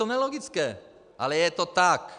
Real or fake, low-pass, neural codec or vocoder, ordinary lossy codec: real; 9.9 kHz; none; MP3, 96 kbps